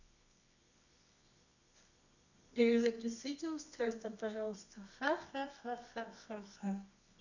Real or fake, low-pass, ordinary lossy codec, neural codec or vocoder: fake; 7.2 kHz; none; codec, 24 kHz, 0.9 kbps, WavTokenizer, medium music audio release